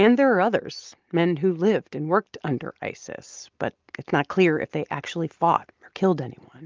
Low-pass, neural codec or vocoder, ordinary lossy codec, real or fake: 7.2 kHz; none; Opus, 32 kbps; real